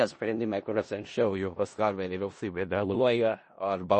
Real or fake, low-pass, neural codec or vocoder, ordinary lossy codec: fake; 10.8 kHz; codec, 16 kHz in and 24 kHz out, 0.4 kbps, LongCat-Audio-Codec, four codebook decoder; MP3, 32 kbps